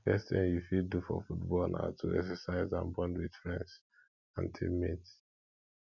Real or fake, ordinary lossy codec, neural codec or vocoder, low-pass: real; none; none; 7.2 kHz